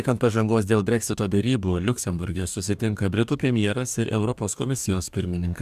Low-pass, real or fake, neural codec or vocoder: 14.4 kHz; fake; codec, 44.1 kHz, 2.6 kbps, DAC